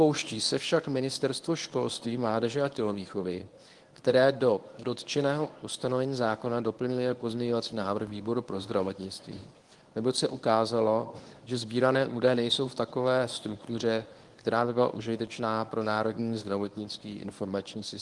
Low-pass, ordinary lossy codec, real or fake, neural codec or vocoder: 10.8 kHz; Opus, 24 kbps; fake; codec, 24 kHz, 0.9 kbps, WavTokenizer, medium speech release version 1